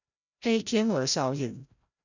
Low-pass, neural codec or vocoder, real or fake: 7.2 kHz; codec, 16 kHz, 0.5 kbps, FreqCodec, larger model; fake